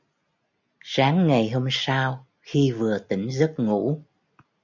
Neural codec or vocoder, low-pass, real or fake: none; 7.2 kHz; real